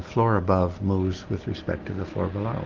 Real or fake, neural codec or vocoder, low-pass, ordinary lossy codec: real; none; 7.2 kHz; Opus, 32 kbps